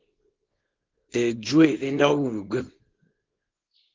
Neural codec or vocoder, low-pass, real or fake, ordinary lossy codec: codec, 24 kHz, 0.9 kbps, WavTokenizer, small release; 7.2 kHz; fake; Opus, 32 kbps